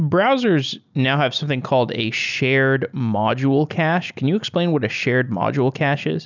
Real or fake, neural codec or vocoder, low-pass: real; none; 7.2 kHz